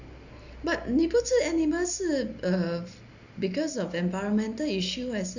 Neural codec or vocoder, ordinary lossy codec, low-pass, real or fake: none; none; 7.2 kHz; real